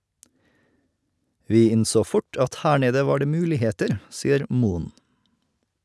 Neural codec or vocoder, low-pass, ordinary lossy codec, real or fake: none; none; none; real